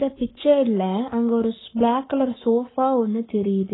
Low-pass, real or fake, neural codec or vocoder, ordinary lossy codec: 7.2 kHz; fake; codec, 44.1 kHz, 7.8 kbps, Pupu-Codec; AAC, 16 kbps